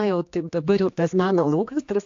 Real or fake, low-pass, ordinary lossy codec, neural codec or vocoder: fake; 7.2 kHz; AAC, 48 kbps; codec, 16 kHz, 2 kbps, X-Codec, HuBERT features, trained on general audio